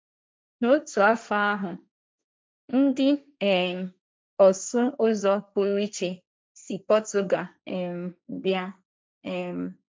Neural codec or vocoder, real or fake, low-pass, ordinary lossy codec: codec, 16 kHz, 1.1 kbps, Voila-Tokenizer; fake; none; none